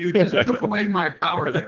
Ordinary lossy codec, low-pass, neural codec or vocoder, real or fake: Opus, 32 kbps; 7.2 kHz; codec, 24 kHz, 1.5 kbps, HILCodec; fake